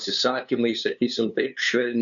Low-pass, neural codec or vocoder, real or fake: 7.2 kHz; codec, 16 kHz, 2 kbps, FunCodec, trained on LibriTTS, 25 frames a second; fake